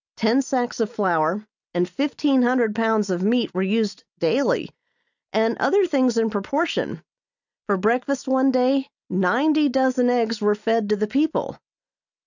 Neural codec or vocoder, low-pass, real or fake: none; 7.2 kHz; real